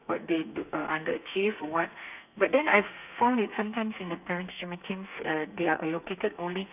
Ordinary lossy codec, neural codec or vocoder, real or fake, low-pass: none; codec, 32 kHz, 1.9 kbps, SNAC; fake; 3.6 kHz